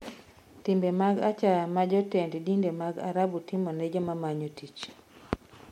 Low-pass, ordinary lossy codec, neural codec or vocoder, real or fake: 19.8 kHz; MP3, 64 kbps; none; real